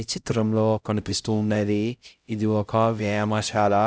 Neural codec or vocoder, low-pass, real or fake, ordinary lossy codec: codec, 16 kHz, 0.5 kbps, X-Codec, HuBERT features, trained on LibriSpeech; none; fake; none